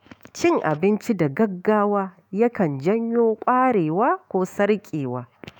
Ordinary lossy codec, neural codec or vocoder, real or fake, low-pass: none; autoencoder, 48 kHz, 128 numbers a frame, DAC-VAE, trained on Japanese speech; fake; 19.8 kHz